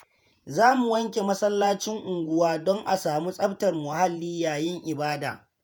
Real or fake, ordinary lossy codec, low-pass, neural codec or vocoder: fake; none; none; vocoder, 48 kHz, 128 mel bands, Vocos